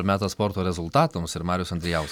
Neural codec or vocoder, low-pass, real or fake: none; 19.8 kHz; real